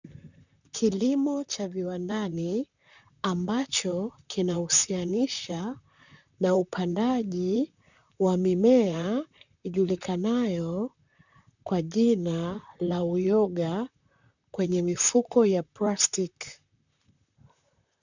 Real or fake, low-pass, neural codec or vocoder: fake; 7.2 kHz; vocoder, 44.1 kHz, 128 mel bands, Pupu-Vocoder